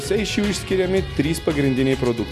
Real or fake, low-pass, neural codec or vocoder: real; 14.4 kHz; none